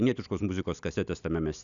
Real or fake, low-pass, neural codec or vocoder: real; 7.2 kHz; none